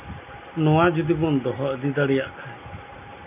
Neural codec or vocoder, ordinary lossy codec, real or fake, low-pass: none; none; real; 3.6 kHz